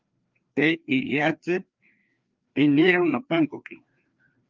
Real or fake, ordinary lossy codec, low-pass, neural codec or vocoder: fake; Opus, 32 kbps; 7.2 kHz; codec, 16 kHz, 2 kbps, FreqCodec, larger model